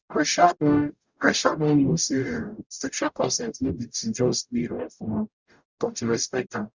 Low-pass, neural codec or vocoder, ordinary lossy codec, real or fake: 7.2 kHz; codec, 44.1 kHz, 0.9 kbps, DAC; Opus, 64 kbps; fake